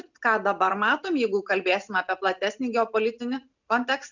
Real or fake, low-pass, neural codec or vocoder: real; 7.2 kHz; none